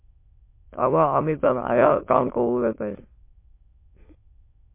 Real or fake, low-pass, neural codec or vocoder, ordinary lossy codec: fake; 3.6 kHz; autoencoder, 22.05 kHz, a latent of 192 numbers a frame, VITS, trained on many speakers; MP3, 24 kbps